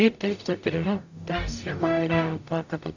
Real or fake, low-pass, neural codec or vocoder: fake; 7.2 kHz; codec, 44.1 kHz, 0.9 kbps, DAC